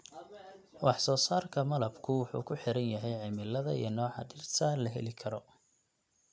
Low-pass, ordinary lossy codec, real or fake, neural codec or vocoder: none; none; real; none